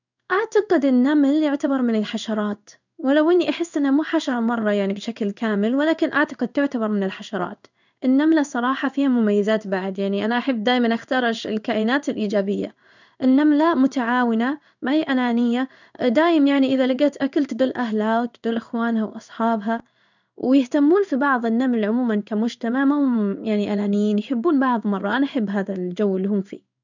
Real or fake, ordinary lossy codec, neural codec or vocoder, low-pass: fake; none; codec, 16 kHz in and 24 kHz out, 1 kbps, XY-Tokenizer; 7.2 kHz